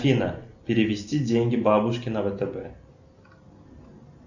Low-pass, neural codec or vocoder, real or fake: 7.2 kHz; none; real